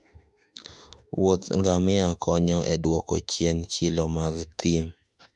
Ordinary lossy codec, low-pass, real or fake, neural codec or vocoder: none; 10.8 kHz; fake; autoencoder, 48 kHz, 32 numbers a frame, DAC-VAE, trained on Japanese speech